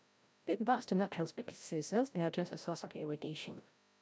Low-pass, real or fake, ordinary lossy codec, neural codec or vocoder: none; fake; none; codec, 16 kHz, 0.5 kbps, FreqCodec, larger model